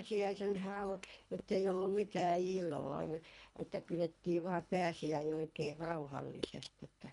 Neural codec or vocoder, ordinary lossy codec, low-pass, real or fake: codec, 24 kHz, 1.5 kbps, HILCodec; MP3, 96 kbps; 10.8 kHz; fake